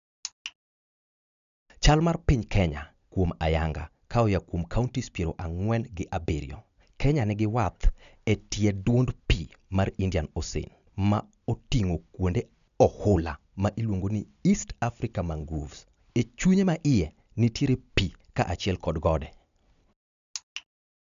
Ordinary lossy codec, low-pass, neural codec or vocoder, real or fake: none; 7.2 kHz; none; real